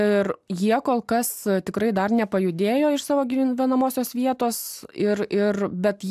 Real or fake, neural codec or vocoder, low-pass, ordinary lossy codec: real; none; 14.4 kHz; AAC, 96 kbps